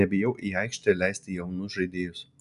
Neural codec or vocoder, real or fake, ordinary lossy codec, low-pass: none; real; MP3, 96 kbps; 10.8 kHz